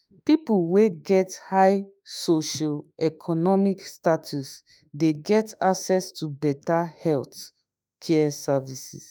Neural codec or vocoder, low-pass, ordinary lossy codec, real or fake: autoencoder, 48 kHz, 32 numbers a frame, DAC-VAE, trained on Japanese speech; none; none; fake